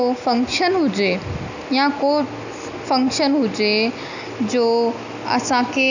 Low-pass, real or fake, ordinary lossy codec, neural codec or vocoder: 7.2 kHz; real; none; none